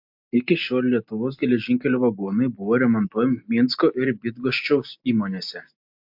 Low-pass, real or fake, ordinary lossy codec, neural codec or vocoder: 5.4 kHz; real; MP3, 48 kbps; none